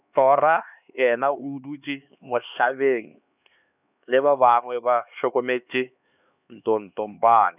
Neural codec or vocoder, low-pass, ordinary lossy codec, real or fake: codec, 16 kHz, 2 kbps, X-Codec, HuBERT features, trained on LibriSpeech; 3.6 kHz; none; fake